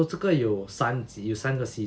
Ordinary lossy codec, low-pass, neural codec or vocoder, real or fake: none; none; none; real